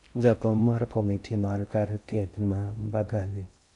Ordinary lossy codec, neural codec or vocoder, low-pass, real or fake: none; codec, 16 kHz in and 24 kHz out, 0.6 kbps, FocalCodec, streaming, 4096 codes; 10.8 kHz; fake